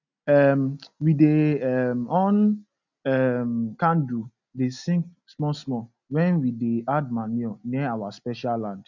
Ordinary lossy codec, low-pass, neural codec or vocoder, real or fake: none; 7.2 kHz; none; real